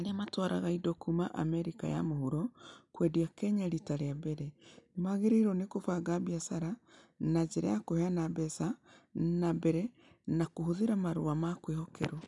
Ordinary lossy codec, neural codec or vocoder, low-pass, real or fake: none; none; 10.8 kHz; real